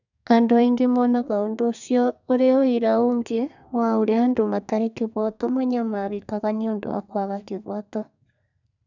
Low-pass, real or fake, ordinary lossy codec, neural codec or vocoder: 7.2 kHz; fake; none; codec, 32 kHz, 1.9 kbps, SNAC